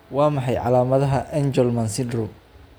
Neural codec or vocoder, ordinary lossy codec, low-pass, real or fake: none; none; none; real